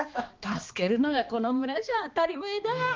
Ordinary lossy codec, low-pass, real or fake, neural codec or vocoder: Opus, 32 kbps; 7.2 kHz; fake; codec, 16 kHz, 2 kbps, X-Codec, HuBERT features, trained on balanced general audio